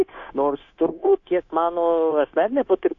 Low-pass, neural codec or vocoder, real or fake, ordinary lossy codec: 7.2 kHz; codec, 16 kHz, 0.9 kbps, LongCat-Audio-Codec; fake; AAC, 48 kbps